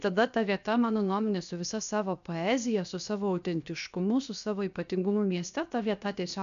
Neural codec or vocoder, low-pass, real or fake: codec, 16 kHz, 0.7 kbps, FocalCodec; 7.2 kHz; fake